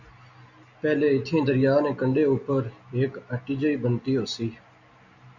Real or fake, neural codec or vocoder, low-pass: real; none; 7.2 kHz